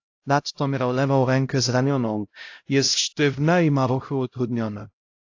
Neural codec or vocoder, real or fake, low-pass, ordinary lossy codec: codec, 16 kHz, 0.5 kbps, X-Codec, HuBERT features, trained on LibriSpeech; fake; 7.2 kHz; AAC, 48 kbps